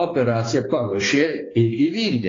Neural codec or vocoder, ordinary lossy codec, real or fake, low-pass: codec, 16 kHz, 2 kbps, X-Codec, HuBERT features, trained on balanced general audio; AAC, 32 kbps; fake; 7.2 kHz